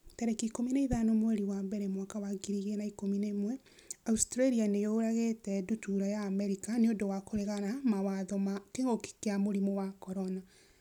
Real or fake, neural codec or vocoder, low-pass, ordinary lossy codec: real; none; 19.8 kHz; none